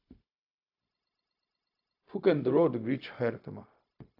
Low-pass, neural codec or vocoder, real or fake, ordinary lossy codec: 5.4 kHz; codec, 16 kHz, 0.4 kbps, LongCat-Audio-Codec; fake; AAC, 48 kbps